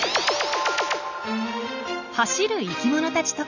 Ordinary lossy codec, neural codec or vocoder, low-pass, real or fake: none; none; 7.2 kHz; real